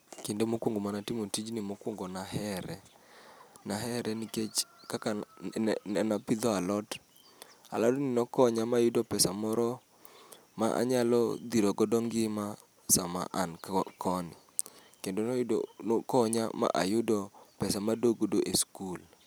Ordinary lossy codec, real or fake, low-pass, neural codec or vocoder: none; real; none; none